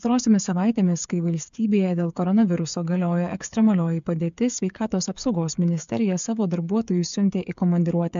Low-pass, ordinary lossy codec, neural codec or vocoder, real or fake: 7.2 kHz; MP3, 64 kbps; codec, 16 kHz, 8 kbps, FreqCodec, smaller model; fake